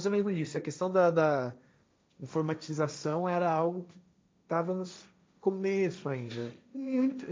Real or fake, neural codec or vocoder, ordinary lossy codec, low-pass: fake; codec, 16 kHz, 1.1 kbps, Voila-Tokenizer; none; none